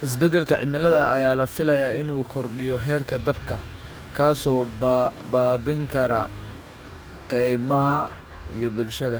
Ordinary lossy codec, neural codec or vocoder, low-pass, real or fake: none; codec, 44.1 kHz, 2.6 kbps, DAC; none; fake